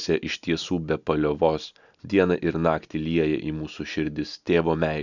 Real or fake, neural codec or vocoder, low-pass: real; none; 7.2 kHz